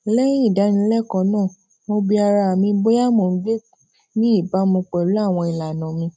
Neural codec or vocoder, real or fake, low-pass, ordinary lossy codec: none; real; none; none